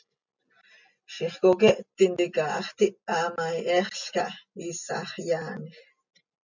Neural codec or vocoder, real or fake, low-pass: none; real; 7.2 kHz